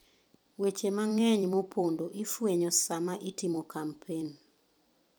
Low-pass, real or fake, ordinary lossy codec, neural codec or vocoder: none; fake; none; vocoder, 44.1 kHz, 128 mel bands every 256 samples, BigVGAN v2